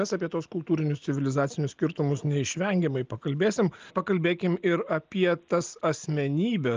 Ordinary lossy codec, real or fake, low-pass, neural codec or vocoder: Opus, 24 kbps; real; 7.2 kHz; none